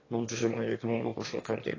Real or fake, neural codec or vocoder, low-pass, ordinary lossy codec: fake; autoencoder, 22.05 kHz, a latent of 192 numbers a frame, VITS, trained on one speaker; 7.2 kHz; AAC, 32 kbps